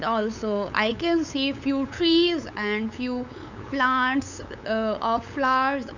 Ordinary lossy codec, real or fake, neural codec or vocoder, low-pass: none; fake; codec, 16 kHz, 8 kbps, FunCodec, trained on LibriTTS, 25 frames a second; 7.2 kHz